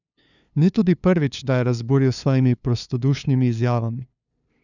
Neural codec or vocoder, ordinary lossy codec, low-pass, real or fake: codec, 16 kHz, 2 kbps, FunCodec, trained on LibriTTS, 25 frames a second; none; 7.2 kHz; fake